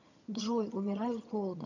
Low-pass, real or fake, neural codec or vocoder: 7.2 kHz; fake; vocoder, 22.05 kHz, 80 mel bands, HiFi-GAN